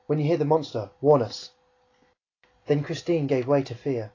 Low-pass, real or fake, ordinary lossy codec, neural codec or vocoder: 7.2 kHz; real; AAC, 32 kbps; none